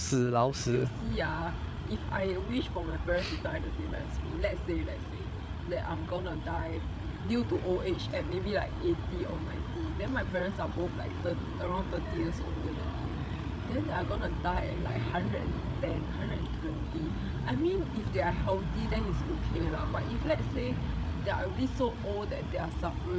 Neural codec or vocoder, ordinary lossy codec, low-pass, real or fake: codec, 16 kHz, 8 kbps, FreqCodec, larger model; none; none; fake